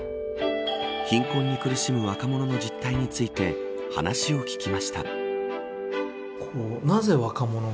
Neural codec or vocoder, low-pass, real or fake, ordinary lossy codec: none; none; real; none